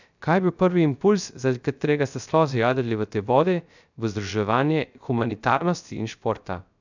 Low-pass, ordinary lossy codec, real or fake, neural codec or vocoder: 7.2 kHz; none; fake; codec, 16 kHz, 0.3 kbps, FocalCodec